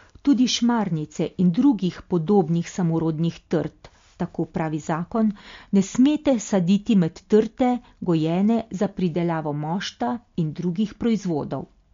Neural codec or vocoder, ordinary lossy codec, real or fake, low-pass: none; MP3, 48 kbps; real; 7.2 kHz